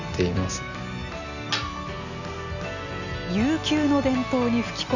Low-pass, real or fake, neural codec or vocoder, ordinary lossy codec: 7.2 kHz; real; none; none